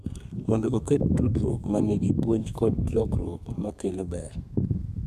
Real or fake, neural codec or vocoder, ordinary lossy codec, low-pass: fake; codec, 32 kHz, 1.9 kbps, SNAC; none; 14.4 kHz